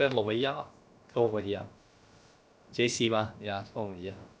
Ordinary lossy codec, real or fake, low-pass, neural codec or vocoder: none; fake; none; codec, 16 kHz, about 1 kbps, DyCAST, with the encoder's durations